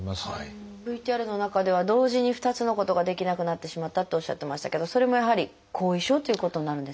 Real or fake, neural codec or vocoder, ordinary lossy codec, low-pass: real; none; none; none